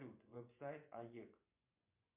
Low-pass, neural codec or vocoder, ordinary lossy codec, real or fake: 3.6 kHz; vocoder, 24 kHz, 100 mel bands, Vocos; MP3, 32 kbps; fake